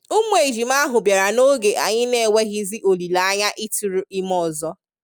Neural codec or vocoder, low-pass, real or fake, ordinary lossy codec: none; none; real; none